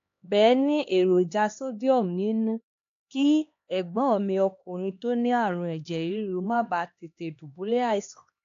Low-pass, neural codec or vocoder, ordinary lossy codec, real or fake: 7.2 kHz; codec, 16 kHz, 2 kbps, X-Codec, HuBERT features, trained on LibriSpeech; AAC, 48 kbps; fake